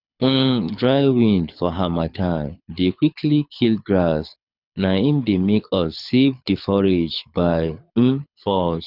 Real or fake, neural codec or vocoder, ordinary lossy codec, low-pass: fake; codec, 24 kHz, 6 kbps, HILCodec; none; 5.4 kHz